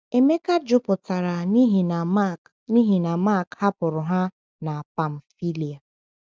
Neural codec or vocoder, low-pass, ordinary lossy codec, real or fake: none; none; none; real